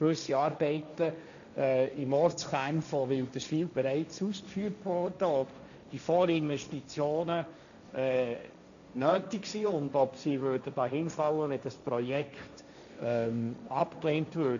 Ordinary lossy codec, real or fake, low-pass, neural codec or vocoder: none; fake; 7.2 kHz; codec, 16 kHz, 1.1 kbps, Voila-Tokenizer